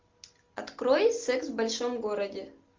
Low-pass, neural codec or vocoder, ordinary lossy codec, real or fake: 7.2 kHz; none; Opus, 24 kbps; real